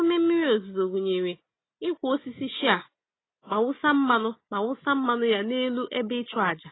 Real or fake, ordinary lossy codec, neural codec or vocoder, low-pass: real; AAC, 16 kbps; none; 7.2 kHz